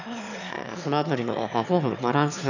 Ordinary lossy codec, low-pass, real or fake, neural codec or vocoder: none; 7.2 kHz; fake; autoencoder, 22.05 kHz, a latent of 192 numbers a frame, VITS, trained on one speaker